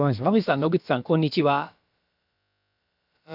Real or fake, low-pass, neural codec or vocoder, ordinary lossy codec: fake; 5.4 kHz; codec, 16 kHz, about 1 kbps, DyCAST, with the encoder's durations; none